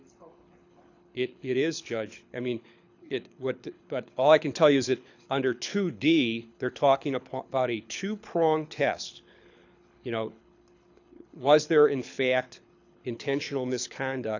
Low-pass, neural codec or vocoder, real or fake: 7.2 kHz; codec, 24 kHz, 6 kbps, HILCodec; fake